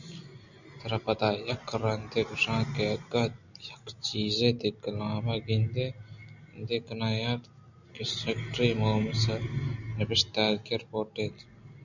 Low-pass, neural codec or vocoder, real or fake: 7.2 kHz; none; real